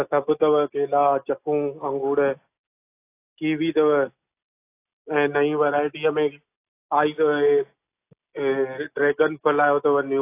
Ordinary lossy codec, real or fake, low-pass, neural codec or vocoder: AAC, 24 kbps; real; 3.6 kHz; none